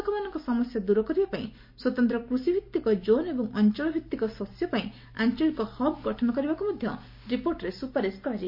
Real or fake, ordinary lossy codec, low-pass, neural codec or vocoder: real; MP3, 32 kbps; 5.4 kHz; none